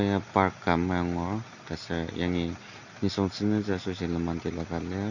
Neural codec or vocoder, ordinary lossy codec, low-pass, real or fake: none; none; 7.2 kHz; real